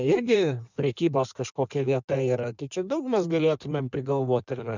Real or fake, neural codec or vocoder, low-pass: fake; codec, 16 kHz in and 24 kHz out, 1.1 kbps, FireRedTTS-2 codec; 7.2 kHz